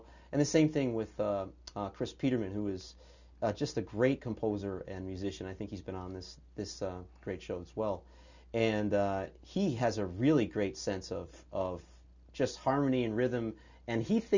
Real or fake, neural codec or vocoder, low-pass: real; none; 7.2 kHz